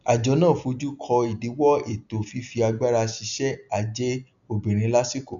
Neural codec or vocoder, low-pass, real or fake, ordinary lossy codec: none; 7.2 kHz; real; none